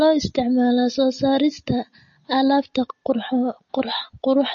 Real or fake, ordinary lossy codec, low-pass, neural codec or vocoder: real; MP3, 32 kbps; 7.2 kHz; none